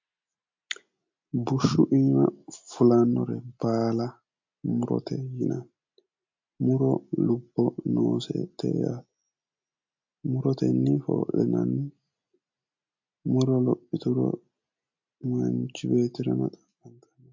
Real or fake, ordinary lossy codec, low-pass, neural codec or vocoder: real; MP3, 48 kbps; 7.2 kHz; none